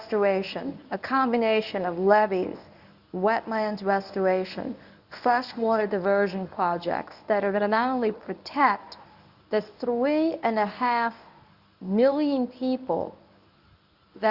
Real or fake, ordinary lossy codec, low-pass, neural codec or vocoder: fake; Opus, 64 kbps; 5.4 kHz; codec, 24 kHz, 0.9 kbps, WavTokenizer, medium speech release version 1